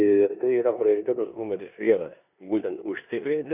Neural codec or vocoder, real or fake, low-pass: codec, 16 kHz in and 24 kHz out, 0.9 kbps, LongCat-Audio-Codec, four codebook decoder; fake; 3.6 kHz